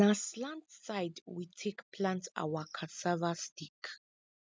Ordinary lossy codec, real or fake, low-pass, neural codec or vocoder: none; real; none; none